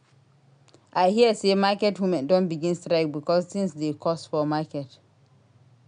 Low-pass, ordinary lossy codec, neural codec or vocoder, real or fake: 9.9 kHz; none; none; real